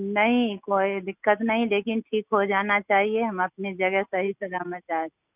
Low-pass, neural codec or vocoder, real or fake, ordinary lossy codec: 3.6 kHz; none; real; none